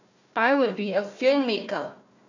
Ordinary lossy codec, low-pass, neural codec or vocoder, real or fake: none; 7.2 kHz; codec, 16 kHz, 1 kbps, FunCodec, trained on Chinese and English, 50 frames a second; fake